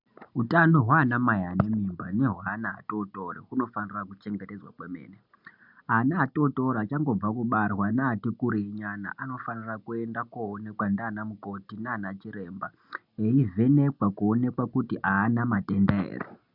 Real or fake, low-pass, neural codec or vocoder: real; 5.4 kHz; none